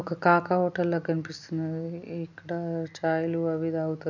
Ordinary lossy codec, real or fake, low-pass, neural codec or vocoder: none; real; 7.2 kHz; none